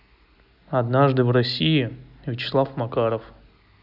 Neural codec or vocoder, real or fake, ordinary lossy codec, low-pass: none; real; none; 5.4 kHz